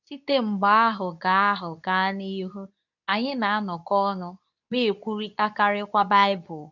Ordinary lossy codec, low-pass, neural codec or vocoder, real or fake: none; 7.2 kHz; codec, 24 kHz, 0.9 kbps, WavTokenizer, medium speech release version 2; fake